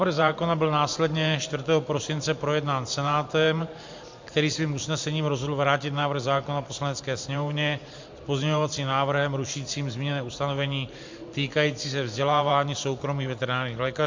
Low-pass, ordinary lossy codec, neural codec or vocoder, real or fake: 7.2 kHz; MP3, 48 kbps; vocoder, 44.1 kHz, 128 mel bands every 512 samples, BigVGAN v2; fake